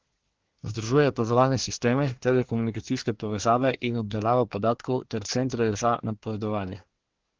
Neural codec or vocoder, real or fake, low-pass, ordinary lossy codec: codec, 24 kHz, 1 kbps, SNAC; fake; 7.2 kHz; Opus, 16 kbps